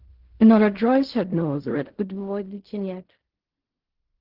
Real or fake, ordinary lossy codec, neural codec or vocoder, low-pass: fake; Opus, 16 kbps; codec, 16 kHz in and 24 kHz out, 0.4 kbps, LongCat-Audio-Codec, fine tuned four codebook decoder; 5.4 kHz